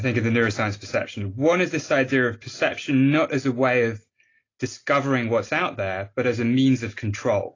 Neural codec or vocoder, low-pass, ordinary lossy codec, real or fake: none; 7.2 kHz; AAC, 32 kbps; real